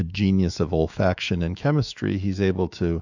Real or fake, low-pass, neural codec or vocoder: fake; 7.2 kHz; autoencoder, 48 kHz, 128 numbers a frame, DAC-VAE, trained on Japanese speech